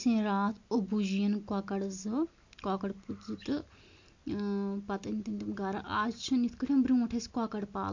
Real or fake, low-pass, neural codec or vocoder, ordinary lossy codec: real; 7.2 kHz; none; MP3, 48 kbps